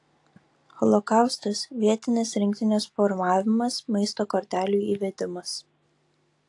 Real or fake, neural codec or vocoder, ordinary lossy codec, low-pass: real; none; AAC, 48 kbps; 10.8 kHz